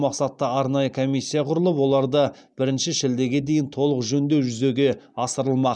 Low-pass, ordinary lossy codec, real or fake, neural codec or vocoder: none; none; real; none